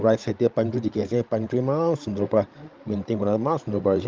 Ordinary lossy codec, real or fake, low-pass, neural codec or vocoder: Opus, 32 kbps; fake; 7.2 kHz; codec, 16 kHz, 16 kbps, FreqCodec, larger model